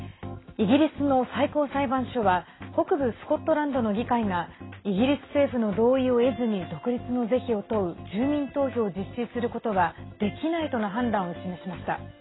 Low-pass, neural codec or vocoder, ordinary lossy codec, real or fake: 7.2 kHz; none; AAC, 16 kbps; real